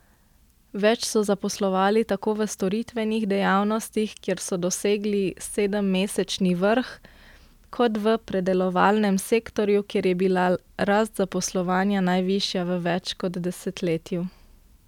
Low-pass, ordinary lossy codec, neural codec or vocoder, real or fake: 19.8 kHz; none; none; real